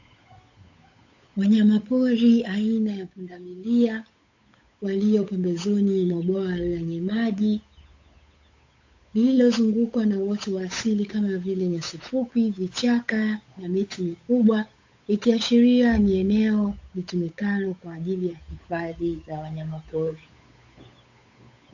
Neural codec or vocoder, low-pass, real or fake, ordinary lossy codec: codec, 16 kHz, 8 kbps, FunCodec, trained on Chinese and English, 25 frames a second; 7.2 kHz; fake; AAC, 48 kbps